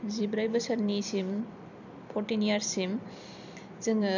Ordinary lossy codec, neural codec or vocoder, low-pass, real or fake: none; vocoder, 44.1 kHz, 128 mel bands every 256 samples, BigVGAN v2; 7.2 kHz; fake